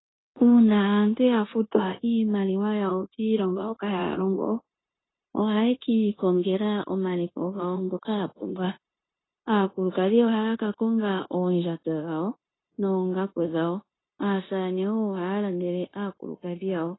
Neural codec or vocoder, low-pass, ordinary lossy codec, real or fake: codec, 16 kHz, 0.9 kbps, LongCat-Audio-Codec; 7.2 kHz; AAC, 16 kbps; fake